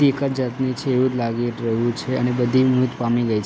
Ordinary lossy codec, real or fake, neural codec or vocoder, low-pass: none; real; none; none